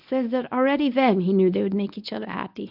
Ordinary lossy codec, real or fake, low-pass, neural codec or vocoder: AAC, 48 kbps; fake; 5.4 kHz; codec, 24 kHz, 0.9 kbps, WavTokenizer, medium speech release version 1